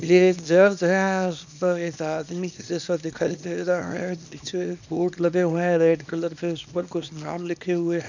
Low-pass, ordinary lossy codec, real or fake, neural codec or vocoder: 7.2 kHz; none; fake; codec, 24 kHz, 0.9 kbps, WavTokenizer, small release